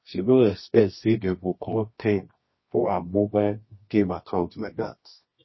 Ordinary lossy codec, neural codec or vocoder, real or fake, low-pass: MP3, 24 kbps; codec, 24 kHz, 0.9 kbps, WavTokenizer, medium music audio release; fake; 7.2 kHz